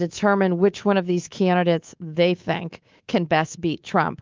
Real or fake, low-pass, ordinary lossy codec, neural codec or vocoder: fake; 7.2 kHz; Opus, 32 kbps; codec, 24 kHz, 3.1 kbps, DualCodec